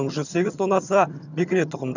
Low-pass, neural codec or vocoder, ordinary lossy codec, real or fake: 7.2 kHz; vocoder, 22.05 kHz, 80 mel bands, HiFi-GAN; none; fake